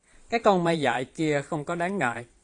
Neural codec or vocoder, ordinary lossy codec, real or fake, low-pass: vocoder, 22.05 kHz, 80 mel bands, Vocos; AAC, 48 kbps; fake; 9.9 kHz